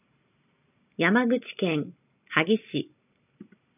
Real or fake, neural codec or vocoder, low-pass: fake; vocoder, 44.1 kHz, 128 mel bands every 256 samples, BigVGAN v2; 3.6 kHz